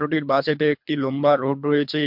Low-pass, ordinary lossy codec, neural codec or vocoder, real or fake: 5.4 kHz; MP3, 48 kbps; codec, 24 kHz, 3 kbps, HILCodec; fake